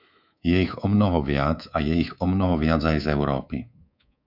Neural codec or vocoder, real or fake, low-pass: codec, 24 kHz, 3.1 kbps, DualCodec; fake; 5.4 kHz